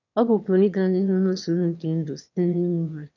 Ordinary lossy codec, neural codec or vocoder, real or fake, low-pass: none; autoencoder, 22.05 kHz, a latent of 192 numbers a frame, VITS, trained on one speaker; fake; 7.2 kHz